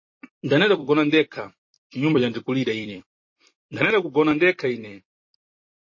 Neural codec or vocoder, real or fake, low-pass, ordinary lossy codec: vocoder, 44.1 kHz, 128 mel bands every 256 samples, BigVGAN v2; fake; 7.2 kHz; MP3, 32 kbps